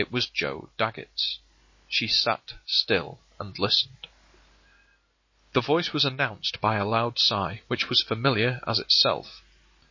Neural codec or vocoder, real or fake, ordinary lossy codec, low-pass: none; real; MP3, 32 kbps; 7.2 kHz